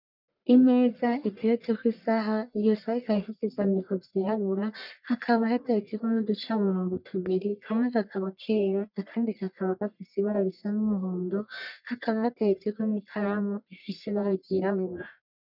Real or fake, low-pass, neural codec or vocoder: fake; 5.4 kHz; codec, 44.1 kHz, 1.7 kbps, Pupu-Codec